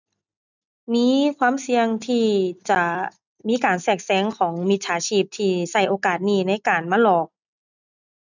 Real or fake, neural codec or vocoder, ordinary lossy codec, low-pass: real; none; none; 7.2 kHz